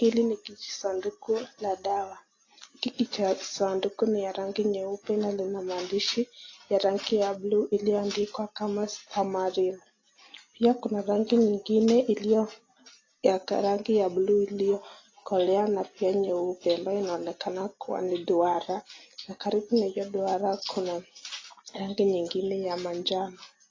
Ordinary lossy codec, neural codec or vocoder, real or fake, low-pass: AAC, 32 kbps; none; real; 7.2 kHz